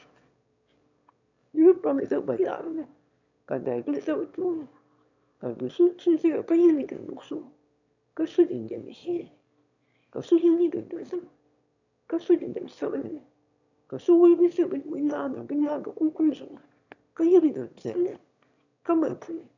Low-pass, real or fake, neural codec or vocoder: 7.2 kHz; fake; autoencoder, 22.05 kHz, a latent of 192 numbers a frame, VITS, trained on one speaker